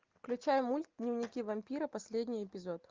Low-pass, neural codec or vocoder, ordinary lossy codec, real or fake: 7.2 kHz; none; Opus, 24 kbps; real